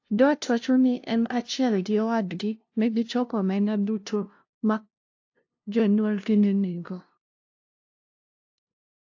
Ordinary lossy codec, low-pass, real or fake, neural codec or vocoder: AAC, 48 kbps; 7.2 kHz; fake; codec, 16 kHz, 0.5 kbps, FunCodec, trained on LibriTTS, 25 frames a second